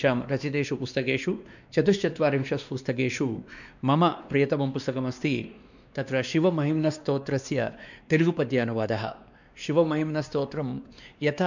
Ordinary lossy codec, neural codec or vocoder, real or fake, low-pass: none; codec, 16 kHz, 2 kbps, X-Codec, WavLM features, trained on Multilingual LibriSpeech; fake; 7.2 kHz